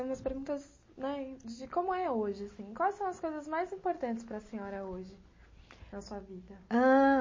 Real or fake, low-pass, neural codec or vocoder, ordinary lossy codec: real; 7.2 kHz; none; MP3, 32 kbps